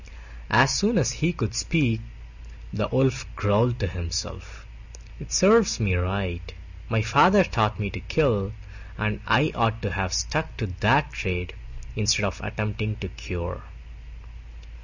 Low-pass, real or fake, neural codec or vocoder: 7.2 kHz; real; none